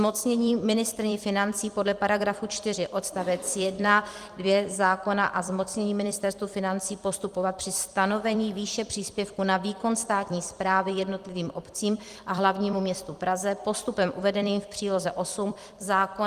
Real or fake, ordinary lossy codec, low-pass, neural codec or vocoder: fake; Opus, 24 kbps; 14.4 kHz; vocoder, 44.1 kHz, 128 mel bands every 256 samples, BigVGAN v2